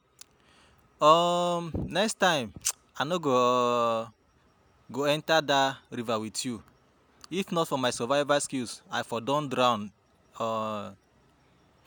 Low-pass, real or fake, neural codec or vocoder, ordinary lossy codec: none; real; none; none